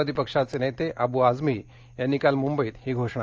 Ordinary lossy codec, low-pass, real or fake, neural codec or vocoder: Opus, 24 kbps; 7.2 kHz; real; none